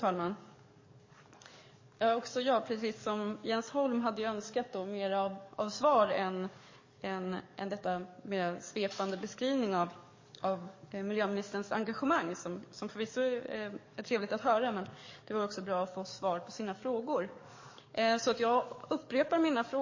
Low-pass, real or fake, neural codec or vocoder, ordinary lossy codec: 7.2 kHz; fake; codec, 44.1 kHz, 7.8 kbps, DAC; MP3, 32 kbps